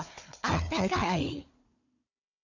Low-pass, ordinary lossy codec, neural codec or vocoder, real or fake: 7.2 kHz; none; codec, 16 kHz, 4 kbps, FunCodec, trained on Chinese and English, 50 frames a second; fake